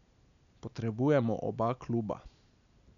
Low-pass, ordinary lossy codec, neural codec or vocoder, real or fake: 7.2 kHz; MP3, 96 kbps; none; real